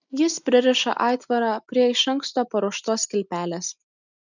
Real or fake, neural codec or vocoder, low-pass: real; none; 7.2 kHz